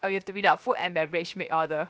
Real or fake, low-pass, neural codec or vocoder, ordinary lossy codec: fake; none; codec, 16 kHz, 0.7 kbps, FocalCodec; none